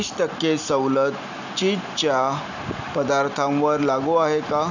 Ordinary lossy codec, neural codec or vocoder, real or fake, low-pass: none; none; real; 7.2 kHz